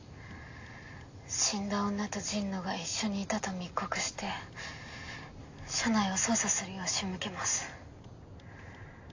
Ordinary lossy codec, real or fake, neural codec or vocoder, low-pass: AAC, 48 kbps; real; none; 7.2 kHz